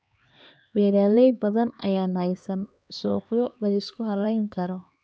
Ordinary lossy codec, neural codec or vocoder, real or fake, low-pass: none; codec, 16 kHz, 2 kbps, X-Codec, HuBERT features, trained on LibriSpeech; fake; none